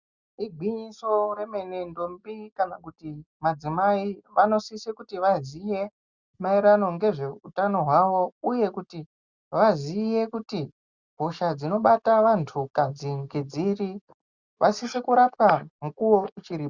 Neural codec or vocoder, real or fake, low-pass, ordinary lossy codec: none; real; 7.2 kHz; Opus, 64 kbps